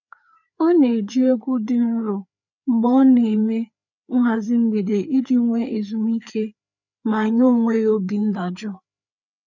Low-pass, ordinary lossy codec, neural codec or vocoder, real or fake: 7.2 kHz; none; codec, 16 kHz, 4 kbps, FreqCodec, larger model; fake